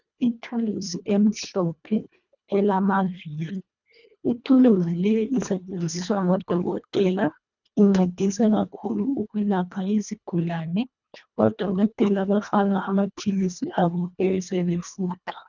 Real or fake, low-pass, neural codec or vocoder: fake; 7.2 kHz; codec, 24 kHz, 1.5 kbps, HILCodec